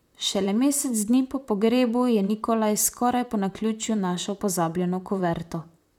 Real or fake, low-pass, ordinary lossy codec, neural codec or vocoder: fake; 19.8 kHz; none; vocoder, 44.1 kHz, 128 mel bands, Pupu-Vocoder